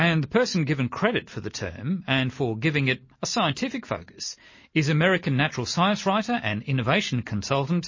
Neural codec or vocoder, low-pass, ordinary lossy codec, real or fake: none; 7.2 kHz; MP3, 32 kbps; real